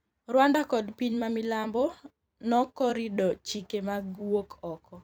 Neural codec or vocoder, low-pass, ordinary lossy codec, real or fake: vocoder, 44.1 kHz, 128 mel bands every 256 samples, BigVGAN v2; none; none; fake